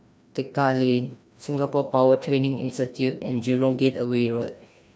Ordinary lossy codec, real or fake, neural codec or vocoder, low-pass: none; fake; codec, 16 kHz, 1 kbps, FreqCodec, larger model; none